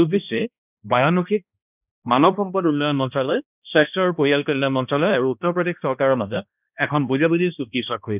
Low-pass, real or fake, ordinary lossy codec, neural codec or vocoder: 3.6 kHz; fake; none; codec, 16 kHz, 1 kbps, X-Codec, HuBERT features, trained on balanced general audio